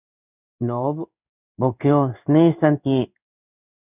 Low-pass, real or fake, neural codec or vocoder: 3.6 kHz; fake; codec, 16 kHz in and 24 kHz out, 1 kbps, XY-Tokenizer